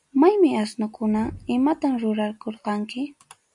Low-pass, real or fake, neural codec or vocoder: 10.8 kHz; real; none